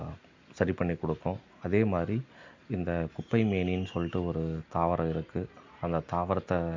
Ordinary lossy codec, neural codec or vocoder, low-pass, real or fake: MP3, 48 kbps; none; 7.2 kHz; real